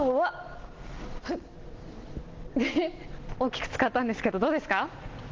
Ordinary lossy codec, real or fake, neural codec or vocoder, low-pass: Opus, 16 kbps; real; none; 7.2 kHz